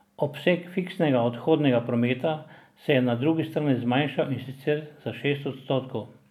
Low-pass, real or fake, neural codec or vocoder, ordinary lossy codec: 19.8 kHz; real; none; none